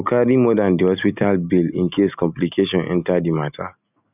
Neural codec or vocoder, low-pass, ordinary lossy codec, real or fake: none; 3.6 kHz; none; real